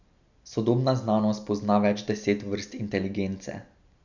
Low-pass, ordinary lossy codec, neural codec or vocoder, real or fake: 7.2 kHz; none; none; real